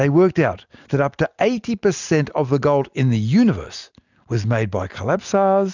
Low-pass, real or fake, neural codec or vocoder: 7.2 kHz; real; none